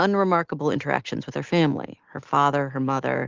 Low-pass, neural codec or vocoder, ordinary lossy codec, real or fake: 7.2 kHz; none; Opus, 16 kbps; real